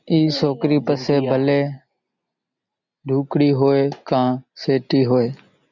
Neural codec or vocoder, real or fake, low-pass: none; real; 7.2 kHz